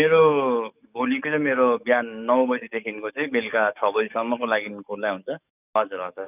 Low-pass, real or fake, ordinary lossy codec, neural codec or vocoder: 3.6 kHz; fake; none; codec, 44.1 kHz, 7.8 kbps, DAC